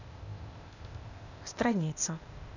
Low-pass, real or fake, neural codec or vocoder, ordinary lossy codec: 7.2 kHz; fake; codec, 16 kHz, 0.8 kbps, ZipCodec; none